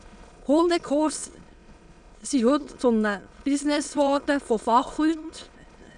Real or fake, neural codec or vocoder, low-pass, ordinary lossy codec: fake; autoencoder, 22.05 kHz, a latent of 192 numbers a frame, VITS, trained on many speakers; 9.9 kHz; none